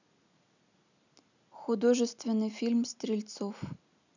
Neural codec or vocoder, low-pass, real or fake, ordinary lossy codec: none; 7.2 kHz; real; none